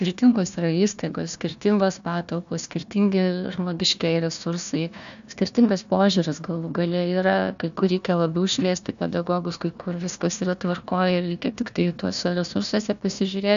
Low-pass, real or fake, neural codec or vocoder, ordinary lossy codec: 7.2 kHz; fake; codec, 16 kHz, 1 kbps, FunCodec, trained on Chinese and English, 50 frames a second; AAC, 96 kbps